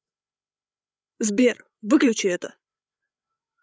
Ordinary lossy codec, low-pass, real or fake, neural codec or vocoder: none; none; fake; codec, 16 kHz, 8 kbps, FreqCodec, larger model